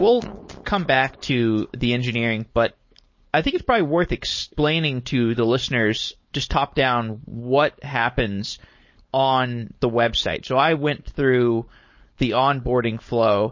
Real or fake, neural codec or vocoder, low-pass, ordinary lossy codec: fake; codec, 16 kHz, 4.8 kbps, FACodec; 7.2 kHz; MP3, 32 kbps